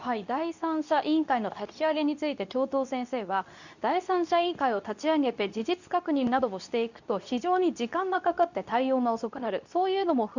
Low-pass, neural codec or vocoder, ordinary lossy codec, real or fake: 7.2 kHz; codec, 24 kHz, 0.9 kbps, WavTokenizer, medium speech release version 2; none; fake